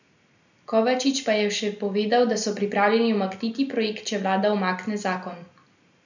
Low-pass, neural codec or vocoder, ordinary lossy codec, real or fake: 7.2 kHz; none; none; real